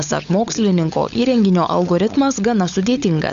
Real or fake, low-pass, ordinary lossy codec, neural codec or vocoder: fake; 7.2 kHz; MP3, 64 kbps; codec, 16 kHz, 16 kbps, FunCodec, trained on LibriTTS, 50 frames a second